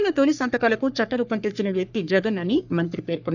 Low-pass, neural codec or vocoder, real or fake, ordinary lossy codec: 7.2 kHz; codec, 44.1 kHz, 3.4 kbps, Pupu-Codec; fake; none